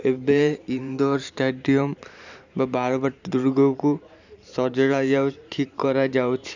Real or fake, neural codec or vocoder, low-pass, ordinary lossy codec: fake; vocoder, 44.1 kHz, 128 mel bands every 512 samples, BigVGAN v2; 7.2 kHz; none